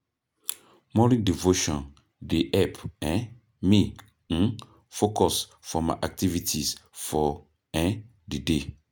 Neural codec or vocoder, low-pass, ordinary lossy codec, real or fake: none; none; none; real